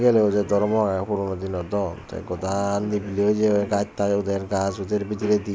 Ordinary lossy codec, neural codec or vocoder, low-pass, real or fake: none; none; none; real